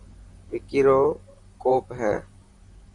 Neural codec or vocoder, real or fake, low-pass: vocoder, 44.1 kHz, 128 mel bands, Pupu-Vocoder; fake; 10.8 kHz